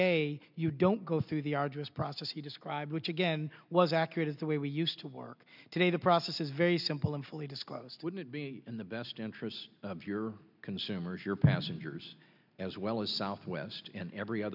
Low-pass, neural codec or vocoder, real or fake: 5.4 kHz; none; real